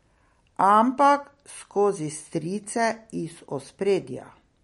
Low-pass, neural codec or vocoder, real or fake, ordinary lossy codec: 19.8 kHz; none; real; MP3, 48 kbps